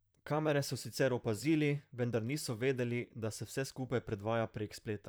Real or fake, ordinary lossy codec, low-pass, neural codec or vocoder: fake; none; none; vocoder, 44.1 kHz, 128 mel bands, Pupu-Vocoder